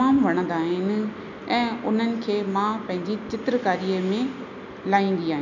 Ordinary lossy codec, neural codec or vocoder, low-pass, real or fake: none; none; 7.2 kHz; real